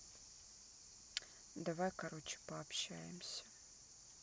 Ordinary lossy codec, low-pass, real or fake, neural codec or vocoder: none; none; real; none